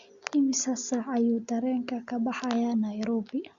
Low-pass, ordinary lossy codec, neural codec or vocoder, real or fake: 7.2 kHz; Opus, 64 kbps; none; real